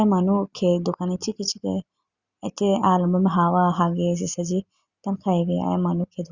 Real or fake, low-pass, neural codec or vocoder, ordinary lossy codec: real; 7.2 kHz; none; Opus, 64 kbps